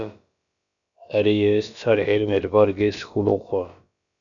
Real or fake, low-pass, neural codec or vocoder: fake; 7.2 kHz; codec, 16 kHz, about 1 kbps, DyCAST, with the encoder's durations